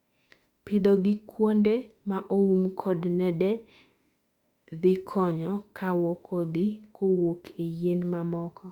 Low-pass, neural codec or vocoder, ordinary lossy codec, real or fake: 19.8 kHz; autoencoder, 48 kHz, 32 numbers a frame, DAC-VAE, trained on Japanese speech; Opus, 64 kbps; fake